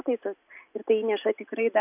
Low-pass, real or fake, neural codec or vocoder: 3.6 kHz; real; none